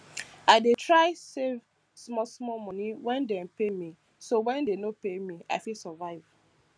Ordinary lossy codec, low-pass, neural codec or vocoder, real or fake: none; none; none; real